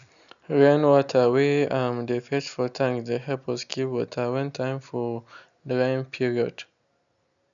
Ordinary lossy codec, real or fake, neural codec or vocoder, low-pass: none; real; none; 7.2 kHz